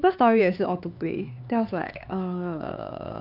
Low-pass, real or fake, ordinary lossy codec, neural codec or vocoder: 5.4 kHz; fake; none; codec, 16 kHz, 4 kbps, X-Codec, HuBERT features, trained on LibriSpeech